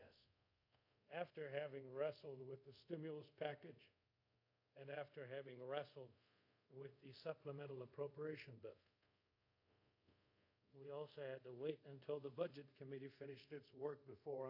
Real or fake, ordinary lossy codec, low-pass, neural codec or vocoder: fake; AAC, 48 kbps; 5.4 kHz; codec, 24 kHz, 0.5 kbps, DualCodec